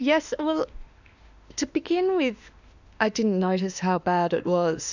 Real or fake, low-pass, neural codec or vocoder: fake; 7.2 kHz; autoencoder, 48 kHz, 32 numbers a frame, DAC-VAE, trained on Japanese speech